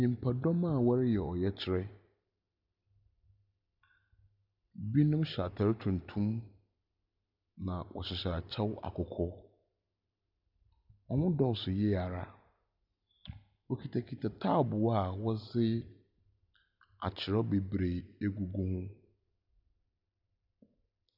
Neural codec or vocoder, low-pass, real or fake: none; 5.4 kHz; real